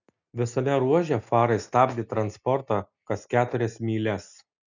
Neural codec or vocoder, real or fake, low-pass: none; real; 7.2 kHz